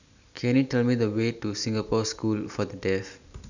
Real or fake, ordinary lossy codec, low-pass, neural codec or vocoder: real; none; 7.2 kHz; none